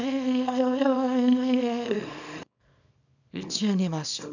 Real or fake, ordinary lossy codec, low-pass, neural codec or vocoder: fake; none; 7.2 kHz; codec, 24 kHz, 0.9 kbps, WavTokenizer, small release